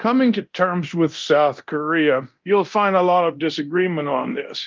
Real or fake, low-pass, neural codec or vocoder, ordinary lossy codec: fake; 7.2 kHz; codec, 24 kHz, 0.9 kbps, DualCodec; Opus, 24 kbps